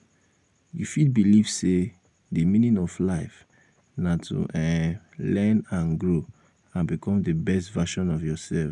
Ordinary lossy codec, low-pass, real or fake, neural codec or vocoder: none; 10.8 kHz; real; none